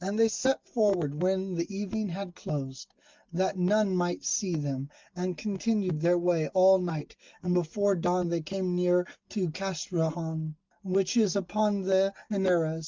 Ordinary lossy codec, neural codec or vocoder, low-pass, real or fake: Opus, 24 kbps; none; 7.2 kHz; real